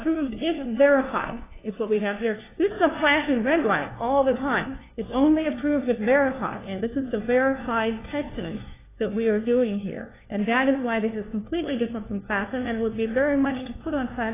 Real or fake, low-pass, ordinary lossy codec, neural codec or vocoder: fake; 3.6 kHz; AAC, 16 kbps; codec, 16 kHz, 1 kbps, FunCodec, trained on LibriTTS, 50 frames a second